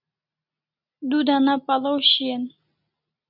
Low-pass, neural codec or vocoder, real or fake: 5.4 kHz; none; real